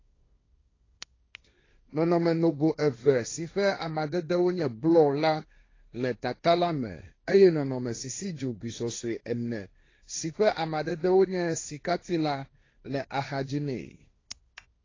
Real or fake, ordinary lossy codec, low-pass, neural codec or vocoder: fake; AAC, 32 kbps; 7.2 kHz; codec, 16 kHz, 1.1 kbps, Voila-Tokenizer